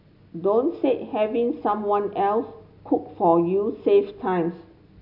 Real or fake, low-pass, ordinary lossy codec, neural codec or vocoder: real; 5.4 kHz; AAC, 48 kbps; none